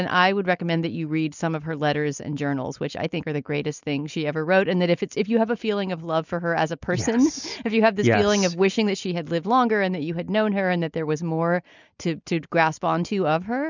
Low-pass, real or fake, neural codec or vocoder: 7.2 kHz; real; none